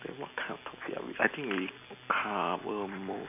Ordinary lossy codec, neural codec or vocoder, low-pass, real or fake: none; none; 3.6 kHz; real